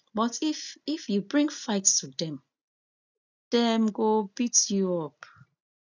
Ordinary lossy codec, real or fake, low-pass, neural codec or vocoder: none; real; 7.2 kHz; none